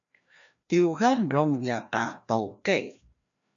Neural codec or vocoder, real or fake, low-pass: codec, 16 kHz, 1 kbps, FreqCodec, larger model; fake; 7.2 kHz